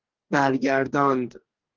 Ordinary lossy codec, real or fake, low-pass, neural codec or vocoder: Opus, 16 kbps; fake; 7.2 kHz; codec, 44.1 kHz, 2.6 kbps, DAC